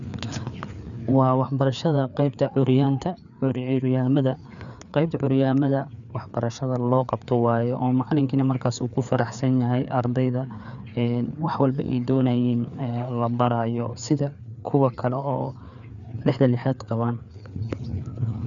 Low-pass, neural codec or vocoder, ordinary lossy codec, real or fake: 7.2 kHz; codec, 16 kHz, 4 kbps, FreqCodec, larger model; none; fake